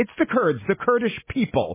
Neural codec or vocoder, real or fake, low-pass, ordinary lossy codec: none; real; 3.6 kHz; MP3, 16 kbps